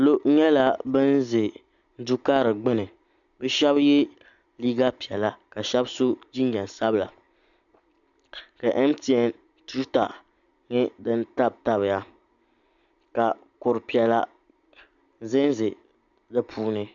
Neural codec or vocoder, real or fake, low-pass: none; real; 7.2 kHz